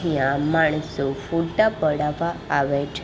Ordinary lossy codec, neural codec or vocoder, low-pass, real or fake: none; none; none; real